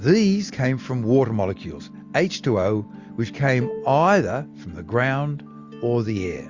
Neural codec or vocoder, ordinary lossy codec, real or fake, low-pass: none; Opus, 64 kbps; real; 7.2 kHz